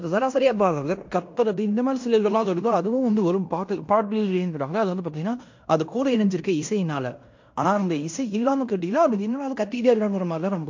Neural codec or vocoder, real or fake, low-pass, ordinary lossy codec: codec, 16 kHz in and 24 kHz out, 0.9 kbps, LongCat-Audio-Codec, fine tuned four codebook decoder; fake; 7.2 kHz; MP3, 48 kbps